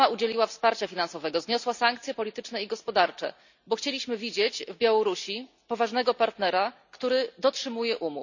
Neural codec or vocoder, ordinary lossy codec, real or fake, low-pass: none; none; real; 7.2 kHz